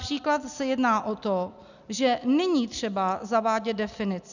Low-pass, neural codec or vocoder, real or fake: 7.2 kHz; none; real